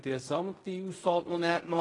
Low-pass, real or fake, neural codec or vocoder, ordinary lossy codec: 10.8 kHz; fake; codec, 16 kHz in and 24 kHz out, 0.4 kbps, LongCat-Audio-Codec, fine tuned four codebook decoder; AAC, 32 kbps